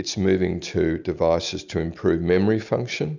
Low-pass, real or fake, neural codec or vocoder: 7.2 kHz; real; none